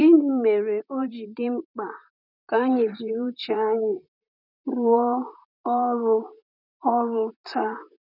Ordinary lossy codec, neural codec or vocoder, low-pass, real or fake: none; vocoder, 44.1 kHz, 128 mel bands, Pupu-Vocoder; 5.4 kHz; fake